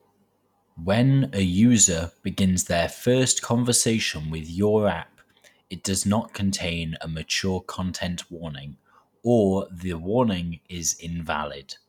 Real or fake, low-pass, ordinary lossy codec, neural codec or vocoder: real; 19.8 kHz; none; none